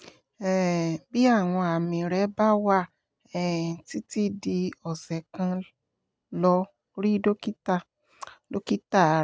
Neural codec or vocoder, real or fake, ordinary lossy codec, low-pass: none; real; none; none